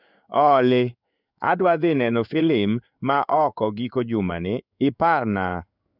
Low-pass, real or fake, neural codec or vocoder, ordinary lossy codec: 5.4 kHz; fake; codec, 16 kHz in and 24 kHz out, 1 kbps, XY-Tokenizer; none